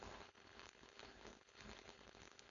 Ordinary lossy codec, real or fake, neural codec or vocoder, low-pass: none; fake; codec, 16 kHz, 4.8 kbps, FACodec; 7.2 kHz